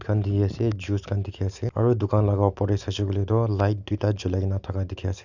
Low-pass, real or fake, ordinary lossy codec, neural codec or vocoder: 7.2 kHz; real; none; none